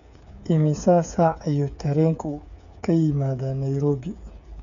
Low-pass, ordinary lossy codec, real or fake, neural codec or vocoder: 7.2 kHz; none; fake; codec, 16 kHz, 8 kbps, FreqCodec, smaller model